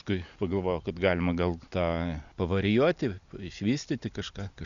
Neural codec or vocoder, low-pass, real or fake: none; 7.2 kHz; real